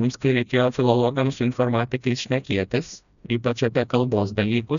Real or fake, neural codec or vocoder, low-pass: fake; codec, 16 kHz, 1 kbps, FreqCodec, smaller model; 7.2 kHz